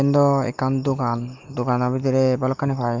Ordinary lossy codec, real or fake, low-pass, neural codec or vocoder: Opus, 32 kbps; real; 7.2 kHz; none